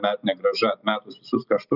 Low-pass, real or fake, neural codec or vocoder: 5.4 kHz; real; none